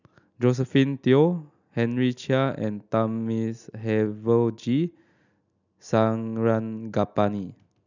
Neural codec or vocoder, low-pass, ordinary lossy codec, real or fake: none; 7.2 kHz; none; real